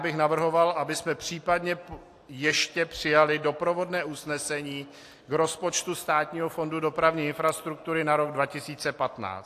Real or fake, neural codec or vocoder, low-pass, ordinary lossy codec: real; none; 14.4 kHz; AAC, 64 kbps